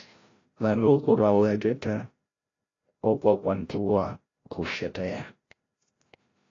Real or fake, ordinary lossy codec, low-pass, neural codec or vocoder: fake; AAC, 32 kbps; 7.2 kHz; codec, 16 kHz, 0.5 kbps, FreqCodec, larger model